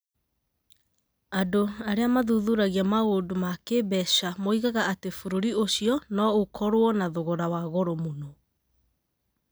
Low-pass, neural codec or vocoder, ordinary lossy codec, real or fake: none; none; none; real